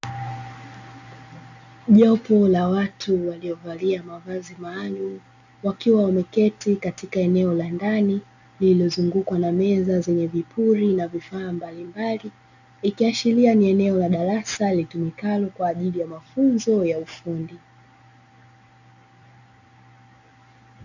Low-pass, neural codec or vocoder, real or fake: 7.2 kHz; none; real